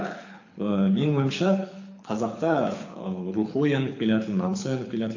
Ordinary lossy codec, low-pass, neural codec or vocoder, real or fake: AAC, 48 kbps; 7.2 kHz; codec, 24 kHz, 6 kbps, HILCodec; fake